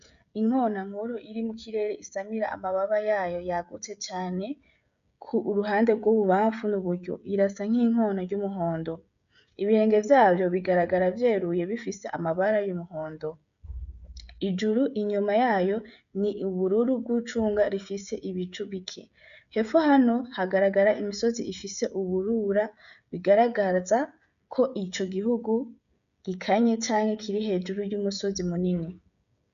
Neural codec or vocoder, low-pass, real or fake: codec, 16 kHz, 16 kbps, FreqCodec, smaller model; 7.2 kHz; fake